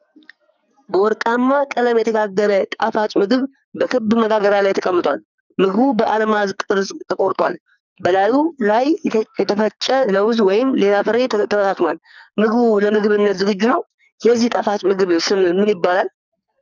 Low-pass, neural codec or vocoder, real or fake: 7.2 kHz; codec, 44.1 kHz, 2.6 kbps, SNAC; fake